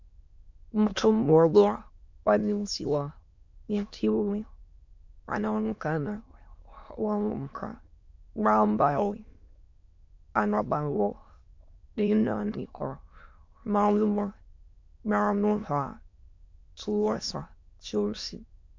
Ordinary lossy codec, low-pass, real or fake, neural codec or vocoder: MP3, 48 kbps; 7.2 kHz; fake; autoencoder, 22.05 kHz, a latent of 192 numbers a frame, VITS, trained on many speakers